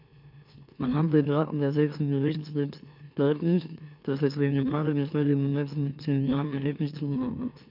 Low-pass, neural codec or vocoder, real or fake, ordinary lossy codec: 5.4 kHz; autoencoder, 44.1 kHz, a latent of 192 numbers a frame, MeloTTS; fake; none